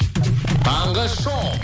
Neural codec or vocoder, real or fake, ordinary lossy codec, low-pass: none; real; none; none